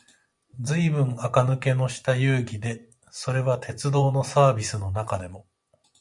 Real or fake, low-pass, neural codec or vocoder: fake; 10.8 kHz; vocoder, 24 kHz, 100 mel bands, Vocos